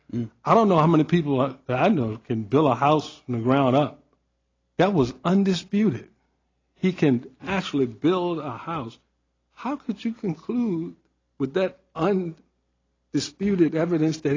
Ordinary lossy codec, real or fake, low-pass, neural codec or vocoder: AAC, 32 kbps; real; 7.2 kHz; none